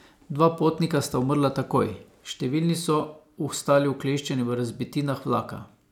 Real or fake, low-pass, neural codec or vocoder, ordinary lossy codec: real; 19.8 kHz; none; none